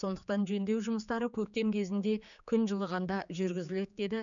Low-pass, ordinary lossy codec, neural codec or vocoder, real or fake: 7.2 kHz; none; codec, 16 kHz, 4 kbps, X-Codec, HuBERT features, trained on general audio; fake